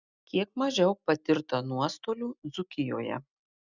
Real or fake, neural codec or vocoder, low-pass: real; none; 7.2 kHz